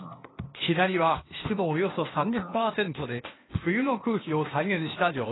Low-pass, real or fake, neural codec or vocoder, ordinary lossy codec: 7.2 kHz; fake; codec, 16 kHz, 0.8 kbps, ZipCodec; AAC, 16 kbps